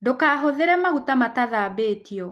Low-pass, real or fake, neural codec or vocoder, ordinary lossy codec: 14.4 kHz; real; none; Opus, 24 kbps